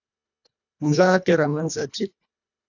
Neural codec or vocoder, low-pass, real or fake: codec, 24 kHz, 1.5 kbps, HILCodec; 7.2 kHz; fake